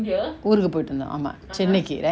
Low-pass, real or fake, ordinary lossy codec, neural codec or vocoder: none; real; none; none